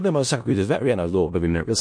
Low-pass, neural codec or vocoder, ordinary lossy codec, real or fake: 9.9 kHz; codec, 16 kHz in and 24 kHz out, 0.4 kbps, LongCat-Audio-Codec, four codebook decoder; MP3, 48 kbps; fake